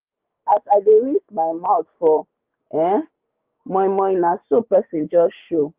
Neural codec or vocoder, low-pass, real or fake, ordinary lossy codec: codec, 16 kHz, 6 kbps, DAC; 3.6 kHz; fake; Opus, 32 kbps